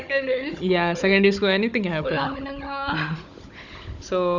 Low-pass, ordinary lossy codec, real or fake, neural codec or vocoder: 7.2 kHz; none; fake; codec, 16 kHz, 16 kbps, FunCodec, trained on Chinese and English, 50 frames a second